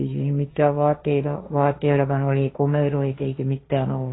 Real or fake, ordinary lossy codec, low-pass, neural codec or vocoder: fake; AAC, 16 kbps; 7.2 kHz; codec, 16 kHz, 1.1 kbps, Voila-Tokenizer